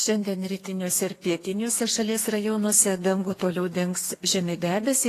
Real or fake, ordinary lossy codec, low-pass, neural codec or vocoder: fake; AAC, 48 kbps; 14.4 kHz; codec, 32 kHz, 1.9 kbps, SNAC